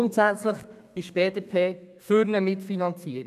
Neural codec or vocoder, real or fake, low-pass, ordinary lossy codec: codec, 32 kHz, 1.9 kbps, SNAC; fake; 14.4 kHz; none